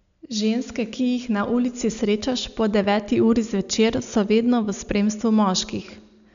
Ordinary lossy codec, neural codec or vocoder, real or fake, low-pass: none; none; real; 7.2 kHz